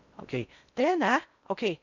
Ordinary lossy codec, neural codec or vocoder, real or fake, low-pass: none; codec, 16 kHz in and 24 kHz out, 0.6 kbps, FocalCodec, streaming, 4096 codes; fake; 7.2 kHz